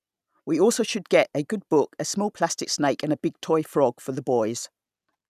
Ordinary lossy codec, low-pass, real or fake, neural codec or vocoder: none; 14.4 kHz; real; none